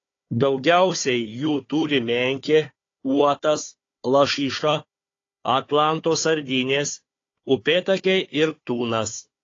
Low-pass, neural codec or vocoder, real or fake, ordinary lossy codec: 7.2 kHz; codec, 16 kHz, 4 kbps, FunCodec, trained on Chinese and English, 50 frames a second; fake; AAC, 32 kbps